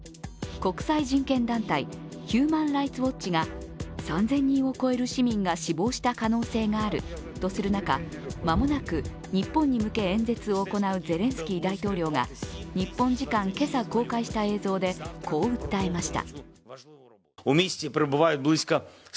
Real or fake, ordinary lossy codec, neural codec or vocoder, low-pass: real; none; none; none